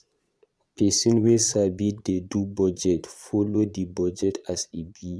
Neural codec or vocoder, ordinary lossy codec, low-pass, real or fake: vocoder, 22.05 kHz, 80 mel bands, Vocos; none; none; fake